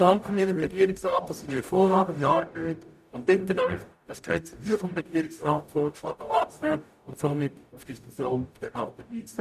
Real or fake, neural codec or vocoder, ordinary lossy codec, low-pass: fake; codec, 44.1 kHz, 0.9 kbps, DAC; none; 14.4 kHz